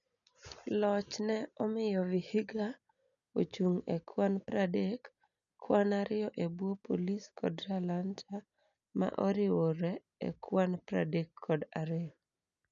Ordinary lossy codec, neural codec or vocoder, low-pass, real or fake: none; none; 7.2 kHz; real